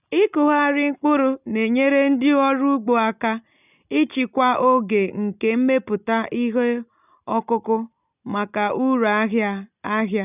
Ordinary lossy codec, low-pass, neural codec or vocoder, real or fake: none; 3.6 kHz; none; real